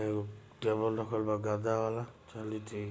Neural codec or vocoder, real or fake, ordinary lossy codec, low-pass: none; real; none; none